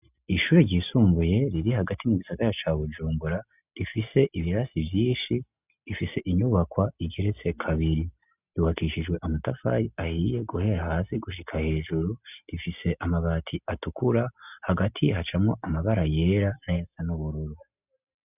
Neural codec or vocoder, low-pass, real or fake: none; 3.6 kHz; real